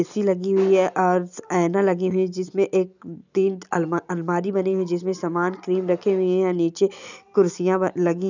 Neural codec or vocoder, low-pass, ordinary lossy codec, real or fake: none; 7.2 kHz; none; real